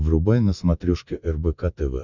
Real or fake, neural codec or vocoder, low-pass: real; none; 7.2 kHz